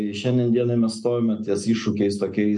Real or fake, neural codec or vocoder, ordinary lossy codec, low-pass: real; none; MP3, 64 kbps; 10.8 kHz